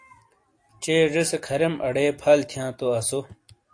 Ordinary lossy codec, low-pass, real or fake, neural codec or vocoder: AAC, 48 kbps; 9.9 kHz; real; none